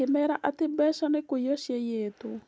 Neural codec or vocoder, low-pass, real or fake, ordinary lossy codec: none; none; real; none